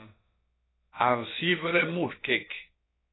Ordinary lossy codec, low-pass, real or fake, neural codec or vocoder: AAC, 16 kbps; 7.2 kHz; fake; codec, 16 kHz, about 1 kbps, DyCAST, with the encoder's durations